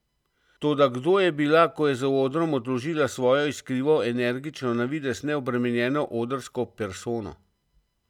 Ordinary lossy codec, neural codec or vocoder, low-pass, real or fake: none; none; 19.8 kHz; real